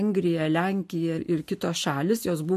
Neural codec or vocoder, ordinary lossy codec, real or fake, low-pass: none; MP3, 64 kbps; real; 14.4 kHz